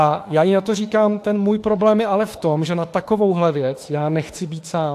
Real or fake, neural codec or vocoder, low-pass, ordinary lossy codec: fake; autoencoder, 48 kHz, 32 numbers a frame, DAC-VAE, trained on Japanese speech; 14.4 kHz; AAC, 64 kbps